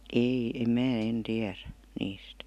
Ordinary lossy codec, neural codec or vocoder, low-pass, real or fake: none; none; 14.4 kHz; real